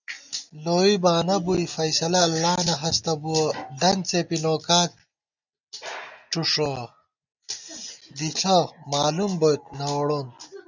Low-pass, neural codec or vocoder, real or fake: 7.2 kHz; none; real